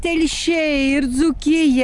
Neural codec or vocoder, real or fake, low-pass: none; real; 10.8 kHz